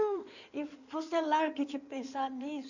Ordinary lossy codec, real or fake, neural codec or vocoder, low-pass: none; fake; codec, 16 kHz in and 24 kHz out, 2.2 kbps, FireRedTTS-2 codec; 7.2 kHz